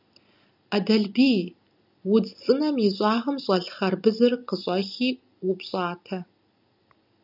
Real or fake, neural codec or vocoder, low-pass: real; none; 5.4 kHz